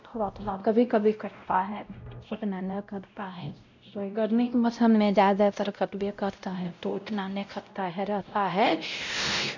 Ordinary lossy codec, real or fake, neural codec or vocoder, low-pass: none; fake; codec, 16 kHz, 0.5 kbps, X-Codec, WavLM features, trained on Multilingual LibriSpeech; 7.2 kHz